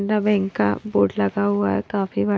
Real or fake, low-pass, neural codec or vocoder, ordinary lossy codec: real; none; none; none